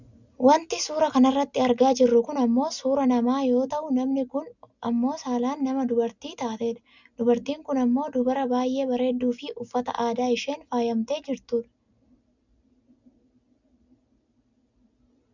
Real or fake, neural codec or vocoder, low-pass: real; none; 7.2 kHz